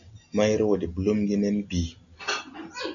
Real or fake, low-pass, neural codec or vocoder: real; 7.2 kHz; none